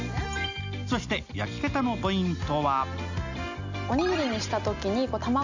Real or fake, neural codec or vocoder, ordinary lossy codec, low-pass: real; none; none; 7.2 kHz